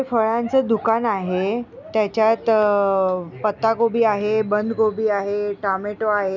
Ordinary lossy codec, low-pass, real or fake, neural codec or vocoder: none; 7.2 kHz; real; none